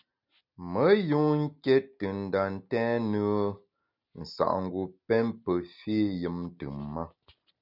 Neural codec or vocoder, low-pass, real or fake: none; 5.4 kHz; real